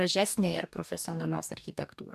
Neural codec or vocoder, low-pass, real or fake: codec, 44.1 kHz, 2.6 kbps, DAC; 14.4 kHz; fake